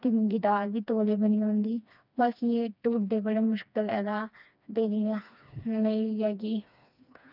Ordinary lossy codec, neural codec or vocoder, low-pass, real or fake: none; codec, 16 kHz, 2 kbps, FreqCodec, smaller model; 5.4 kHz; fake